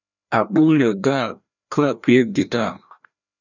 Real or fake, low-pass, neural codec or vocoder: fake; 7.2 kHz; codec, 16 kHz, 1 kbps, FreqCodec, larger model